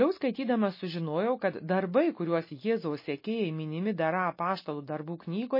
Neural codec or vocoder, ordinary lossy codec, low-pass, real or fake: none; MP3, 24 kbps; 5.4 kHz; real